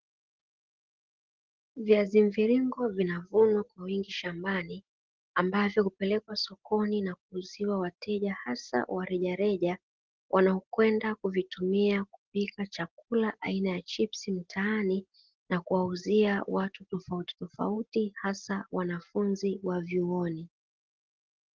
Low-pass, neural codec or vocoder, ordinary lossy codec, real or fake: 7.2 kHz; none; Opus, 16 kbps; real